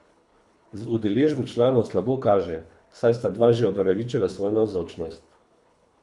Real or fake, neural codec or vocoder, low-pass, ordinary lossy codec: fake; codec, 24 kHz, 3 kbps, HILCodec; 10.8 kHz; none